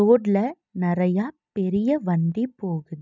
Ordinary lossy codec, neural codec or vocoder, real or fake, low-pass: none; none; real; 7.2 kHz